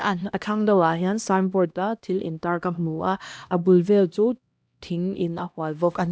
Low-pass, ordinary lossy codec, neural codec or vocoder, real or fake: none; none; codec, 16 kHz, 1 kbps, X-Codec, HuBERT features, trained on LibriSpeech; fake